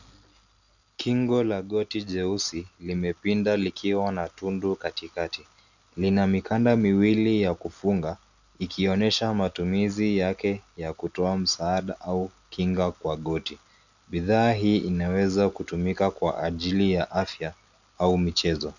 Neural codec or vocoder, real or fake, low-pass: none; real; 7.2 kHz